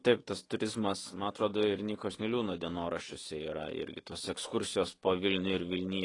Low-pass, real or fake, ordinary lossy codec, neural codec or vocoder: 10.8 kHz; fake; AAC, 32 kbps; autoencoder, 48 kHz, 128 numbers a frame, DAC-VAE, trained on Japanese speech